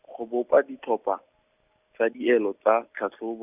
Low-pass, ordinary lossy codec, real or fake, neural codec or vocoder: 3.6 kHz; none; real; none